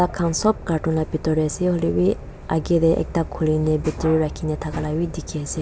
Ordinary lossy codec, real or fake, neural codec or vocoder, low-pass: none; real; none; none